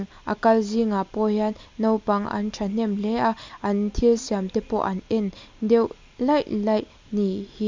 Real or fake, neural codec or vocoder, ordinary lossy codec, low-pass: real; none; MP3, 64 kbps; 7.2 kHz